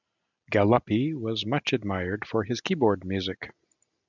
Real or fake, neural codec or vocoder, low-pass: real; none; 7.2 kHz